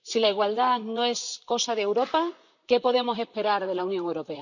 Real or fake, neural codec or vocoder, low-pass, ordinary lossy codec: fake; vocoder, 44.1 kHz, 128 mel bands, Pupu-Vocoder; 7.2 kHz; none